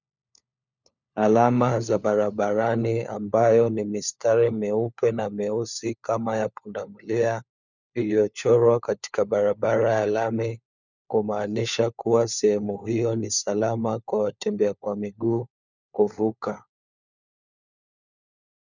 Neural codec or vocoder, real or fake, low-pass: codec, 16 kHz, 4 kbps, FunCodec, trained on LibriTTS, 50 frames a second; fake; 7.2 kHz